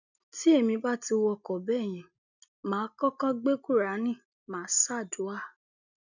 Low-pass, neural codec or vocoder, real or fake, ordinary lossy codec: 7.2 kHz; none; real; none